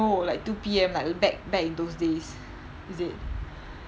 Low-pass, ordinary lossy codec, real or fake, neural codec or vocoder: none; none; real; none